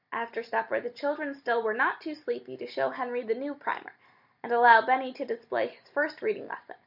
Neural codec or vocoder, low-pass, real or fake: none; 5.4 kHz; real